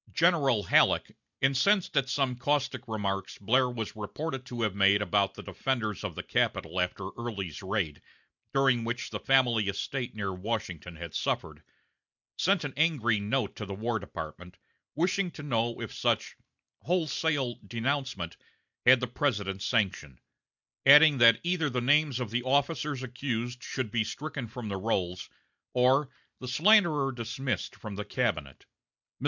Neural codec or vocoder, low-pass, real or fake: none; 7.2 kHz; real